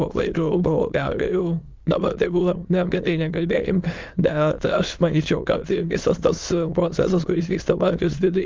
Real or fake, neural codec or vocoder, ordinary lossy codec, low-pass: fake; autoencoder, 22.05 kHz, a latent of 192 numbers a frame, VITS, trained on many speakers; Opus, 32 kbps; 7.2 kHz